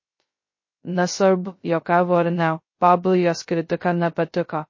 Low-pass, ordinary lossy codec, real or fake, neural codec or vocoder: 7.2 kHz; MP3, 32 kbps; fake; codec, 16 kHz, 0.2 kbps, FocalCodec